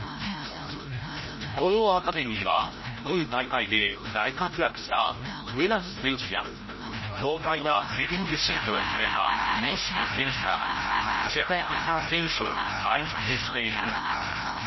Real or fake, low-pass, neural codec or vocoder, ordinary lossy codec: fake; 7.2 kHz; codec, 16 kHz, 0.5 kbps, FreqCodec, larger model; MP3, 24 kbps